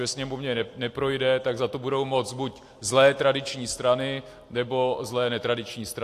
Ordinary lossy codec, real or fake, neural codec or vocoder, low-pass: AAC, 64 kbps; real; none; 14.4 kHz